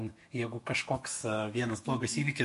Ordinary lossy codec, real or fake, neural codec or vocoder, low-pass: MP3, 48 kbps; fake; autoencoder, 48 kHz, 128 numbers a frame, DAC-VAE, trained on Japanese speech; 14.4 kHz